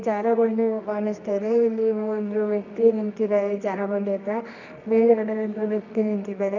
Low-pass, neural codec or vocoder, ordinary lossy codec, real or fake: 7.2 kHz; codec, 24 kHz, 0.9 kbps, WavTokenizer, medium music audio release; none; fake